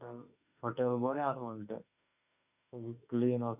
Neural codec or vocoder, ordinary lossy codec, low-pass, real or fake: autoencoder, 48 kHz, 32 numbers a frame, DAC-VAE, trained on Japanese speech; none; 3.6 kHz; fake